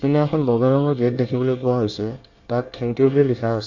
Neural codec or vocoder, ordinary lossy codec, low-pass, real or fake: codec, 24 kHz, 1 kbps, SNAC; Opus, 64 kbps; 7.2 kHz; fake